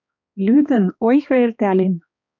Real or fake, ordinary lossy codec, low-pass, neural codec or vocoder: fake; MP3, 64 kbps; 7.2 kHz; codec, 16 kHz, 2 kbps, X-Codec, HuBERT features, trained on balanced general audio